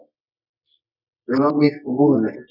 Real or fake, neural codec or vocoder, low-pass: fake; codec, 24 kHz, 0.9 kbps, WavTokenizer, medium music audio release; 5.4 kHz